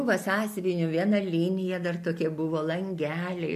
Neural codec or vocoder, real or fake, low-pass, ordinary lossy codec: none; real; 14.4 kHz; MP3, 64 kbps